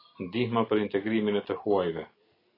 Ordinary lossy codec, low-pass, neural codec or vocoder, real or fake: AAC, 24 kbps; 5.4 kHz; none; real